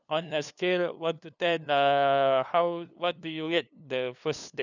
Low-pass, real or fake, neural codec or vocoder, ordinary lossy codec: 7.2 kHz; fake; codec, 16 kHz, 2 kbps, FunCodec, trained on LibriTTS, 25 frames a second; none